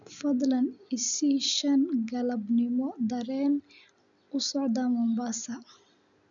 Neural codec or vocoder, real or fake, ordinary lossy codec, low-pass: none; real; none; 7.2 kHz